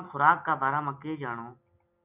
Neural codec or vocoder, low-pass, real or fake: none; 3.6 kHz; real